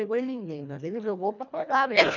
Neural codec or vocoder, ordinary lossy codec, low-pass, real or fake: codec, 24 kHz, 1.5 kbps, HILCodec; none; 7.2 kHz; fake